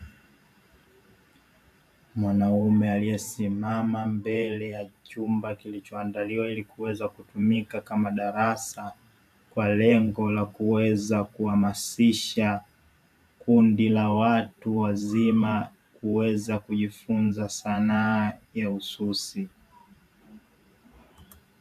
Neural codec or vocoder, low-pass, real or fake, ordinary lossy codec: vocoder, 44.1 kHz, 128 mel bands every 512 samples, BigVGAN v2; 14.4 kHz; fake; AAC, 96 kbps